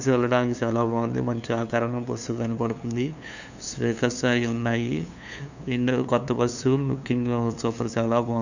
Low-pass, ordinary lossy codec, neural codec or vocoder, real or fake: 7.2 kHz; none; codec, 16 kHz, 2 kbps, FunCodec, trained on LibriTTS, 25 frames a second; fake